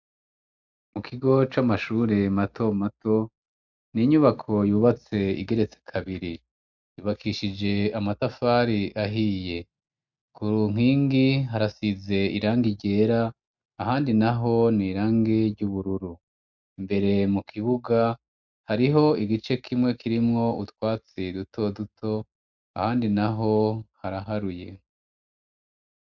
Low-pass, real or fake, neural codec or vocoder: 7.2 kHz; real; none